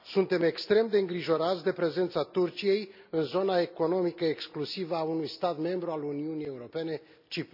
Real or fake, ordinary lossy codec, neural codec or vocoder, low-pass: real; none; none; 5.4 kHz